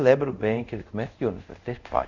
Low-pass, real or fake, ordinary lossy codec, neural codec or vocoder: 7.2 kHz; fake; none; codec, 24 kHz, 0.5 kbps, DualCodec